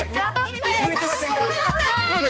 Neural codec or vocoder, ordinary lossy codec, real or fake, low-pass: codec, 16 kHz, 2 kbps, X-Codec, HuBERT features, trained on balanced general audio; none; fake; none